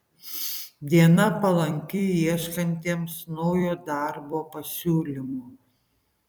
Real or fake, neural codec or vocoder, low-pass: fake; vocoder, 44.1 kHz, 128 mel bands every 256 samples, BigVGAN v2; 19.8 kHz